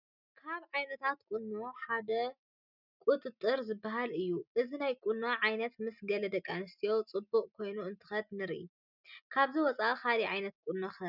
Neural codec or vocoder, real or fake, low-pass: none; real; 5.4 kHz